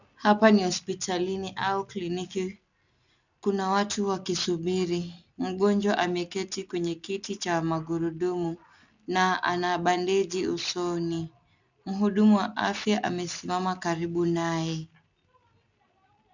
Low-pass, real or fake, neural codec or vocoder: 7.2 kHz; real; none